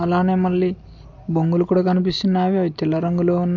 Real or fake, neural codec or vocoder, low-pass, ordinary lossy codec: real; none; 7.2 kHz; MP3, 48 kbps